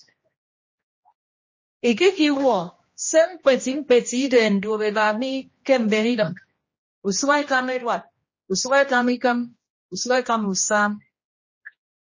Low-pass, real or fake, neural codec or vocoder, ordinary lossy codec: 7.2 kHz; fake; codec, 16 kHz, 1 kbps, X-Codec, HuBERT features, trained on general audio; MP3, 32 kbps